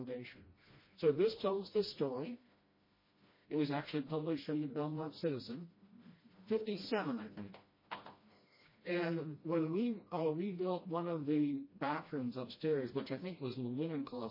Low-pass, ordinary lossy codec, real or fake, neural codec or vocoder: 5.4 kHz; MP3, 24 kbps; fake; codec, 16 kHz, 1 kbps, FreqCodec, smaller model